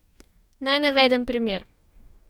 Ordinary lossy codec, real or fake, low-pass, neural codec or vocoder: none; fake; 19.8 kHz; codec, 44.1 kHz, 2.6 kbps, DAC